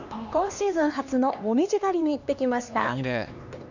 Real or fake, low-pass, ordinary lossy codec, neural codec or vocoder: fake; 7.2 kHz; none; codec, 16 kHz, 2 kbps, X-Codec, HuBERT features, trained on LibriSpeech